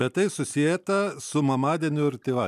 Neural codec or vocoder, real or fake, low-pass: none; real; 14.4 kHz